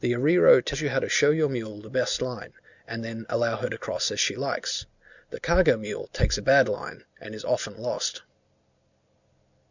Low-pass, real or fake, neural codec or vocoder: 7.2 kHz; real; none